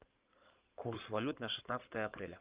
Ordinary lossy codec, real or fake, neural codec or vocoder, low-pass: Opus, 24 kbps; fake; codec, 16 kHz in and 24 kHz out, 2.2 kbps, FireRedTTS-2 codec; 3.6 kHz